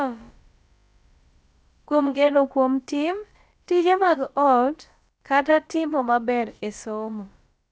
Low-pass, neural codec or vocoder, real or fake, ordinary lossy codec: none; codec, 16 kHz, about 1 kbps, DyCAST, with the encoder's durations; fake; none